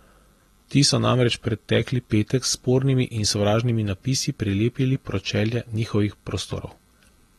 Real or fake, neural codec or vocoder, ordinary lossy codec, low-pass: real; none; AAC, 32 kbps; 19.8 kHz